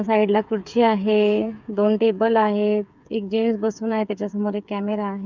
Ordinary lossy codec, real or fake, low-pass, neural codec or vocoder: none; fake; 7.2 kHz; codec, 16 kHz, 8 kbps, FreqCodec, smaller model